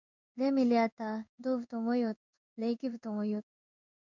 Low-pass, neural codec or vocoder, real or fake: 7.2 kHz; codec, 16 kHz in and 24 kHz out, 1 kbps, XY-Tokenizer; fake